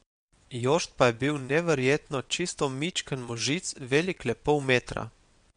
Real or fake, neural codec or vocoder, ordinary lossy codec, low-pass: fake; vocoder, 22.05 kHz, 80 mel bands, WaveNeXt; MP3, 64 kbps; 9.9 kHz